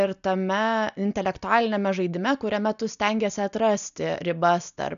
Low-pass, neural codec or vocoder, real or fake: 7.2 kHz; none; real